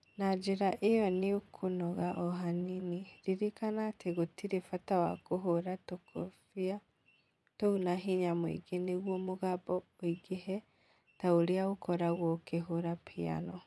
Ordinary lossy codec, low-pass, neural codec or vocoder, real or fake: none; none; vocoder, 24 kHz, 100 mel bands, Vocos; fake